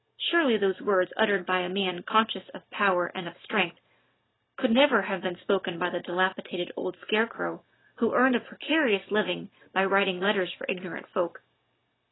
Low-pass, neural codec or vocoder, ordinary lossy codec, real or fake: 7.2 kHz; none; AAC, 16 kbps; real